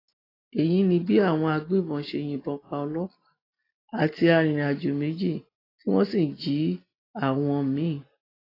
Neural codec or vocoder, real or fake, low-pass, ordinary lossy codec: none; real; 5.4 kHz; AAC, 24 kbps